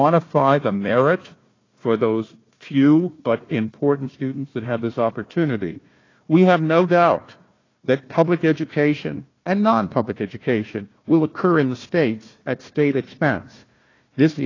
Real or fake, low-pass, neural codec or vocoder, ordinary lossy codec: fake; 7.2 kHz; codec, 16 kHz, 1 kbps, FunCodec, trained on Chinese and English, 50 frames a second; AAC, 32 kbps